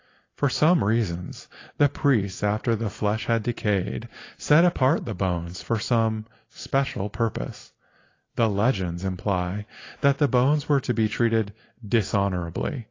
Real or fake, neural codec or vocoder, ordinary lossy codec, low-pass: real; none; AAC, 32 kbps; 7.2 kHz